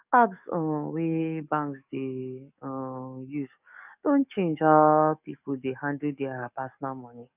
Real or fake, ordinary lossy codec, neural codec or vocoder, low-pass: fake; none; codec, 44.1 kHz, 7.8 kbps, DAC; 3.6 kHz